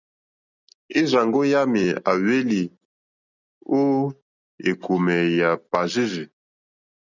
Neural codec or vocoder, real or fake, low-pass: none; real; 7.2 kHz